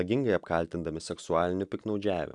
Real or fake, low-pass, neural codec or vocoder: real; 10.8 kHz; none